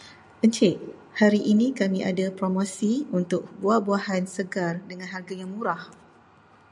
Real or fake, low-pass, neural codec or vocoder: real; 10.8 kHz; none